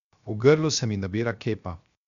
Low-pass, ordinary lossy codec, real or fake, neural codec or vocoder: 7.2 kHz; none; fake; codec, 16 kHz, 0.7 kbps, FocalCodec